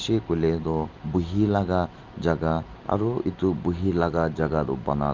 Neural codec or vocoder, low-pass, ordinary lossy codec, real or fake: none; 7.2 kHz; Opus, 32 kbps; real